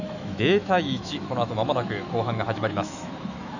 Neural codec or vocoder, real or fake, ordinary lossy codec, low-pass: autoencoder, 48 kHz, 128 numbers a frame, DAC-VAE, trained on Japanese speech; fake; none; 7.2 kHz